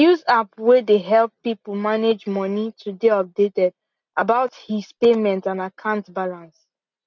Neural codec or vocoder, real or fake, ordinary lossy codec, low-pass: none; real; none; 7.2 kHz